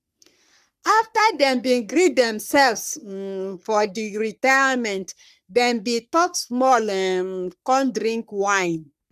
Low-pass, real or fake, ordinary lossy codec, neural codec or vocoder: 14.4 kHz; fake; none; codec, 44.1 kHz, 3.4 kbps, Pupu-Codec